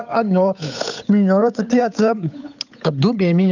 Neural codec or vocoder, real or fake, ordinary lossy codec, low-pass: codec, 16 kHz, 8 kbps, FunCodec, trained on Chinese and English, 25 frames a second; fake; none; 7.2 kHz